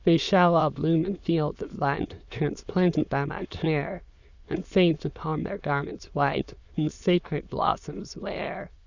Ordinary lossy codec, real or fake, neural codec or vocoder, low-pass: Opus, 64 kbps; fake; autoencoder, 22.05 kHz, a latent of 192 numbers a frame, VITS, trained on many speakers; 7.2 kHz